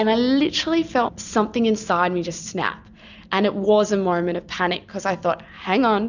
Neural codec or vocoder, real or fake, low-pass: none; real; 7.2 kHz